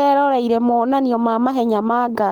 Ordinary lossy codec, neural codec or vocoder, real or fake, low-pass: Opus, 32 kbps; codec, 44.1 kHz, 7.8 kbps, Pupu-Codec; fake; 19.8 kHz